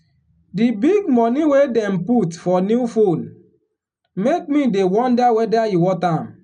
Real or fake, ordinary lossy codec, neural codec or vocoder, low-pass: real; none; none; 9.9 kHz